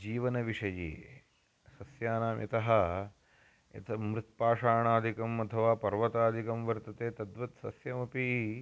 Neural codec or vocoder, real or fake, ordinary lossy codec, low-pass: none; real; none; none